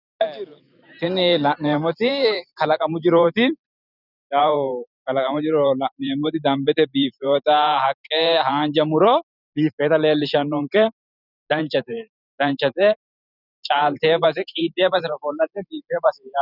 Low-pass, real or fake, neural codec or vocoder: 5.4 kHz; fake; vocoder, 44.1 kHz, 128 mel bands every 512 samples, BigVGAN v2